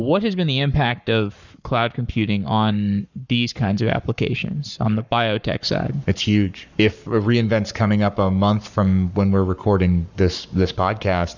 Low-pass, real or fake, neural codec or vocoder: 7.2 kHz; fake; codec, 44.1 kHz, 7.8 kbps, Pupu-Codec